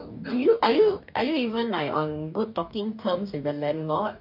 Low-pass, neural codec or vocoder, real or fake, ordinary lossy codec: 5.4 kHz; codec, 44.1 kHz, 2.6 kbps, DAC; fake; none